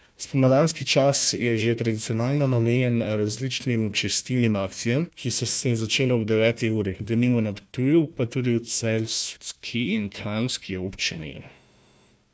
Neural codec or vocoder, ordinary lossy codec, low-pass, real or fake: codec, 16 kHz, 1 kbps, FunCodec, trained on Chinese and English, 50 frames a second; none; none; fake